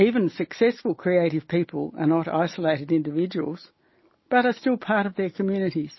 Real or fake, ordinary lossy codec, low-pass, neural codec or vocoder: real; MP3, 24 kbps; 7.2 kHz; none